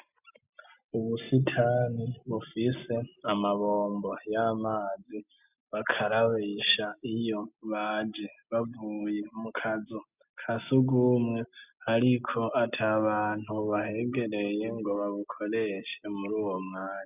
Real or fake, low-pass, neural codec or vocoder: real; 3.6 kHz; none